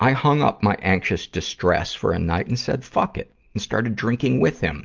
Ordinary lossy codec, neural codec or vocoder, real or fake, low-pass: Opus, 32 kbps; none; real; 7.2 kHz